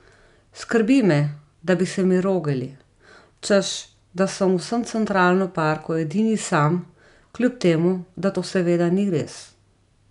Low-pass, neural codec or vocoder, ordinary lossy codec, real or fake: 10.8 kHz; none; none; real